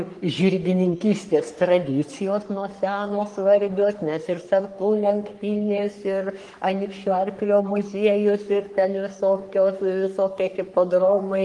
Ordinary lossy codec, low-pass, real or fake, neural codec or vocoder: Opus, 24 kbps; 10.8 kHz; fake; codec, 44.1 kHz, 3.4 kbps, Pupu-Codec